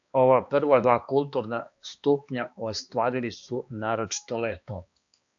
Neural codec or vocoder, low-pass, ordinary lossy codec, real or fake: codec, 16 kHz, 2 kbps, X-Codec, HuBERT features, trained on balanced general audio; 7.2 kHz; AAC, 64 kbps; fake